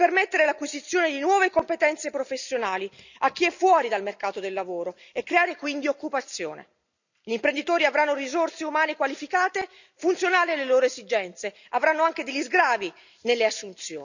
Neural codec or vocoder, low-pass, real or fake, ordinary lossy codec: none; 7.2 kHz; real; none